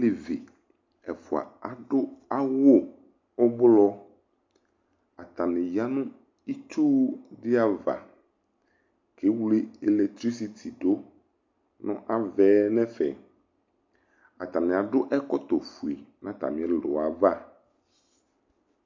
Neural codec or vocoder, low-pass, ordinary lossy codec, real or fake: none; 7.2 kHz; MP3, 48 kbps; real